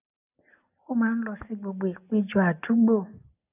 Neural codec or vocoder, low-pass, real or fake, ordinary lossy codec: none; 3.6 kHz; real; none